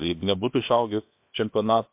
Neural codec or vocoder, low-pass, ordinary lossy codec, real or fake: codec, 16 kHz, 0.7 kbps, FocalCodec; 3.6 kHz; MP3, 32 kbps; fake